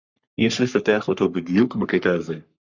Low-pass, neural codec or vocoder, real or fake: 7.2 kHz; codec, 44.1 kHz, 3.4 kbps, Pupu-Codec; fake